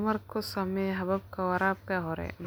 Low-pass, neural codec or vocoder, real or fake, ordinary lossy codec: none; none; real; none